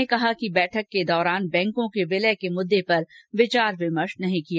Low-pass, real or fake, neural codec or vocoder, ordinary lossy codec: none; real; none; none